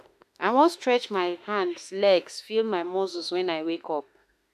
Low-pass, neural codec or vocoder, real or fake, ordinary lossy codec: 14.4 kHz; autoencoder, 48 kHz, 32 numbers a frame, DAC-VAE, trained on Japanese speech; fake; none